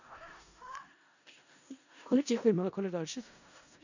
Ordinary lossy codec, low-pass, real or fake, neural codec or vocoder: none; 7.2 kHz; fake; codec, 16 kHz in and 24 kHz out, 0.4 kbps, LongCat-Audio-Codec, four codebook decoder